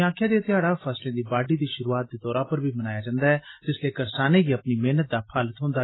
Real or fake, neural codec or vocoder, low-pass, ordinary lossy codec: real; none; 7.2 kHz; AAC, 16 kbps